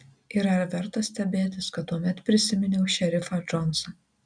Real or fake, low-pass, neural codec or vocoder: real; 9.9 kHz; none